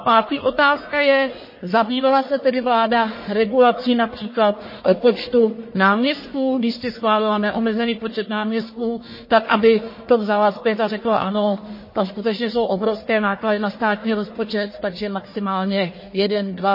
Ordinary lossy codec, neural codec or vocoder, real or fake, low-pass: MP3, 24 kbps; codec, 44.1 kHz, 1.7 kbps, Pupu-Codec; fake; 5.4 kHz